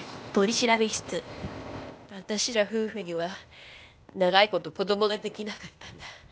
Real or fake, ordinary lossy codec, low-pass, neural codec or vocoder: fake; none; none; codec, 16 kHz, 0.8 kbps, ZipCodec